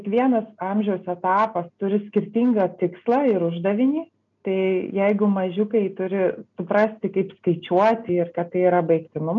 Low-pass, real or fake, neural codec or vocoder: 7.2 kHz; real; none